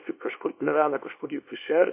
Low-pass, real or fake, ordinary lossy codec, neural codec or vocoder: 3.6 kHz; fake; MP3, 24 kbps; codec, 24 kHz, 0.9 kbps, WavTokenizer, small release